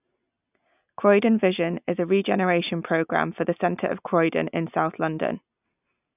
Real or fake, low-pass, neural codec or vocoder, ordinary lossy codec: real; 3.6 kHz; none; none